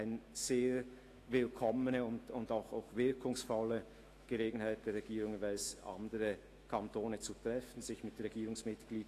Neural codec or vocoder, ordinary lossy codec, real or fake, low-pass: autoencoder, 48 kHz, 128 numbers a frame, DAC-VAE, trained on Japanese speech; AAC, 48 kbps; fake; 14.4 kHz